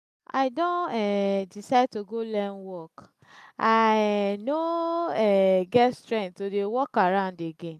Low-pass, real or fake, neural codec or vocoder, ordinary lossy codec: 14.4 kHz; real; none; none